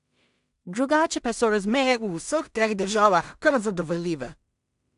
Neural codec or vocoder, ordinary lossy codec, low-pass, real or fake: codec, 16 kHz in and 24 kHz out, 0.4 kbps, LongCat-Audio-Codec, two codebook decoder; AAC, 96 kbps; 10.8 kHz; fake